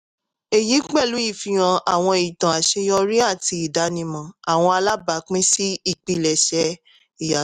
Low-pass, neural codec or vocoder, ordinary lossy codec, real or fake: 14.4 kHz; none; none; real